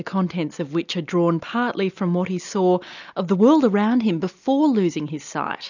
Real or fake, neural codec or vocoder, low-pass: real; none; 7.2 kHz